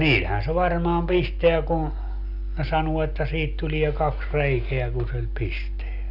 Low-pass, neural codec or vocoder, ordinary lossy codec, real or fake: 5.4 kHz; none; none; real